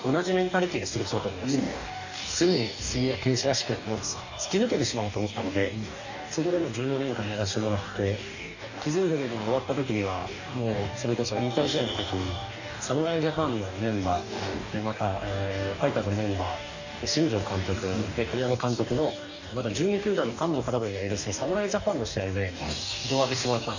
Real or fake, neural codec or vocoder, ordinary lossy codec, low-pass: fake; codec, 44.1 kHz, 2.6 kbps, DAC; none; 7.2 kHz